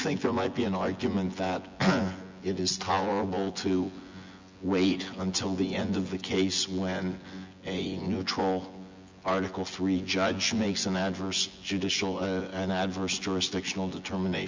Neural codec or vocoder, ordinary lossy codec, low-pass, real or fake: vocoder, 24 kHz, 100 mel bands, Vocos; MP3, 64 kbps; 7.2 kHz; fake